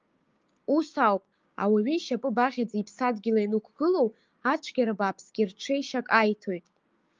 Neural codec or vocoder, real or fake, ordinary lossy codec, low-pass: codec, 16 kHz, 6 kbps, DAC; fake; Opus, 24 kbps; 7.2 kHz